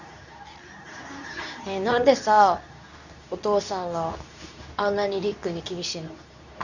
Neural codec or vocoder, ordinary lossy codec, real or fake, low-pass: codec, 24 kHz, 0.9 kbps, WavTokenizer, medium speech release version 2; none; fake; 7.2 kHz